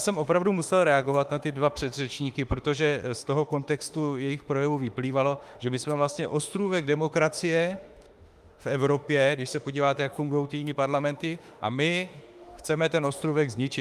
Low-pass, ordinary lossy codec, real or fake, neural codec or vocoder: 14.4 kHz; Opus, 32 kbps; fake; autoencoder, 48 kHz, 32 numbers a frame, DAC-VAE, trained on Japanese speech